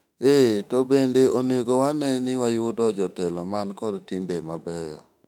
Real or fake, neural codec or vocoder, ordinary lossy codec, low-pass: fake; autoencoder, 48 kHz, 32 numbers a frame, DAC-VAE, trained on Japanese speech; none; 19.8 kHz